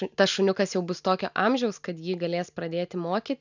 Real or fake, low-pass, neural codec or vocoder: real; 7.2 kHz; none